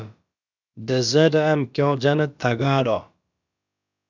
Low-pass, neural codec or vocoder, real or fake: 7.2 kHz; codec, 16 kHz, about 1 kbps, DyCAST, with the encoder's durations; fake